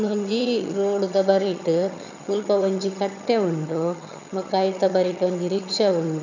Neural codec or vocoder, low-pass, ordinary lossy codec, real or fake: vocoder, 22.05 kHz, 80 mel bands, HiFi-GAN; 7.2 kHz; none; fake